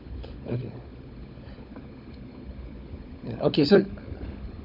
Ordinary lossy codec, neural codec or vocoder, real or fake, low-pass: none; codec, 16 kHz, 16 kbps, FunCodec, trained on LibriTTS, 50 frames a second; fake; 5.4 kHz